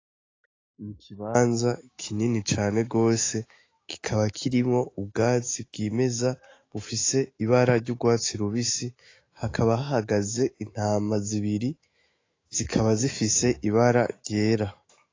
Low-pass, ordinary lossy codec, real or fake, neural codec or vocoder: 7.2 kHz; AAC, 32 kbps; fake; autoencoder, 48 kHz, 128 numbers a frame, DAC-VAE, trained on Japanese speech